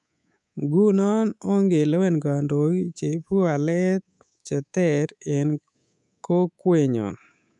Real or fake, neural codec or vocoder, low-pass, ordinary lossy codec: fake; codec, 24 kHz, 3.1 kbps, DualCodec; 10.8 kHz; none